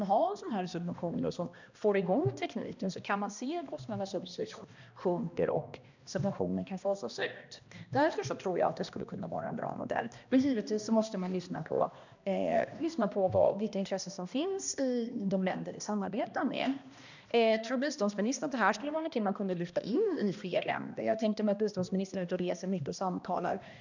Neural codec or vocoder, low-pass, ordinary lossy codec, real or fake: codec, 16 kHz, 1 kbps, X-Codec, HuBERT features, trained on balanced general audio; 7.2 kHz; none; fake